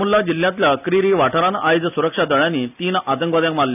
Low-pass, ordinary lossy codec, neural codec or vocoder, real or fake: 3.6 kHz; none; none; real